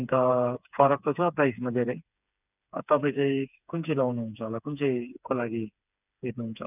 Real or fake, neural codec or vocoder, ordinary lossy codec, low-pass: fake; codec, 16 kHz, 4 kbps, FreqCodec, smaller model; none; 3.6 kHz